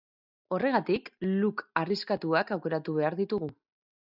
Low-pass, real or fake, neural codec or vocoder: 5.4 kHz; real; none